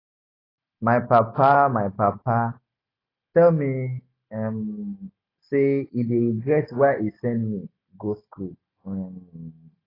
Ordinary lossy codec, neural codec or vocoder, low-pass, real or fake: AAC, 24 kbps; none; 5.4 kHz; real